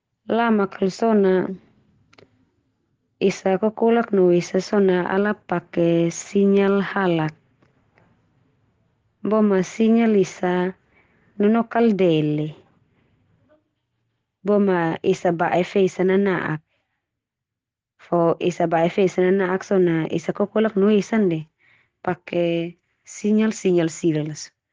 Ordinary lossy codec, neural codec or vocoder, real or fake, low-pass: Opus, 16 kbps; none; real; 7.2 kHz